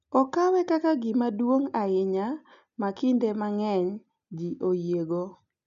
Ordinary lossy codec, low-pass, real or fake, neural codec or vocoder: none; 7.2 kHz; real; none